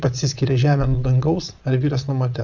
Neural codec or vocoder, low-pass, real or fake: vocoder, 22.05 kHz, 80 mel bands, Vocos; 7.2 kHz; fake